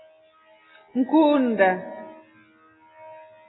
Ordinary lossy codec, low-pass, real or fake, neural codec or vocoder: AAC, 16 kbps; 7.2 kHz; real; none